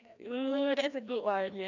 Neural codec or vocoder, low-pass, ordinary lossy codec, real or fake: codec, 16 kHz, 1 kbps, FreqCodec, larger model; 7.2 kHz; none; fake